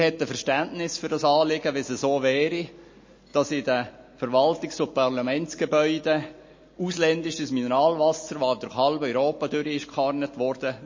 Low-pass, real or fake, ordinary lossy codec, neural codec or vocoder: 7.2 kHz; real; MP3, 32 kbps; none